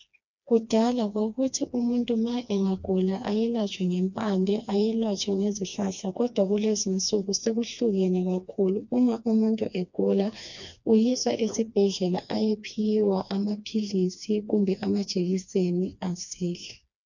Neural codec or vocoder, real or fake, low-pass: codec, 16 kHz, 2 kbps, FreqCodec, smaller model; fake; 7.2 kHz